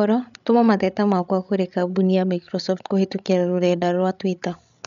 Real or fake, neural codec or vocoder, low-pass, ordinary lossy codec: fake; codec, 16 kHz, 16 kbps, FreqCodec, larger model; 7.2 kHz; none